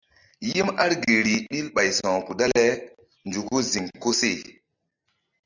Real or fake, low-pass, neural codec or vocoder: real; 7.2 kHz; none